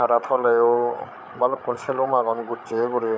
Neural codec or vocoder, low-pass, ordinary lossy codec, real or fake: codec, 16 kHz, 8 kbps, FreqCodec, larger model; none; none; fake